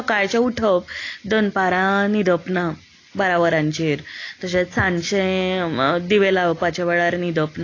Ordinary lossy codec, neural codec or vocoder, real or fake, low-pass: AAC, 32 kbps; none; real; 7.2 kHz